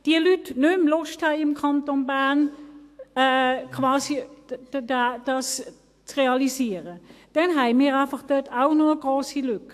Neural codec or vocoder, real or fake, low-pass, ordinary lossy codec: autoencoder, 48 kHz, 128 numbers a frame, DAC-VAE, trained on Japanese speech; fake; 14.4 kHz; AAC, 64 kbps